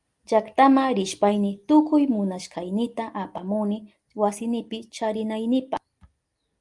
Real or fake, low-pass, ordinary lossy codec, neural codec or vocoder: real; 10.8 kHz; Opus, 32 kbps; none